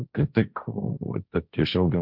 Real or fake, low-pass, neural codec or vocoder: fake; 5.4 kHz; codec, 16 kHz, 1.1 kbps, Voila-Tokenizer